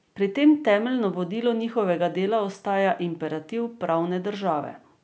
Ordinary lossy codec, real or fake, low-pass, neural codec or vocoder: none; real; none; none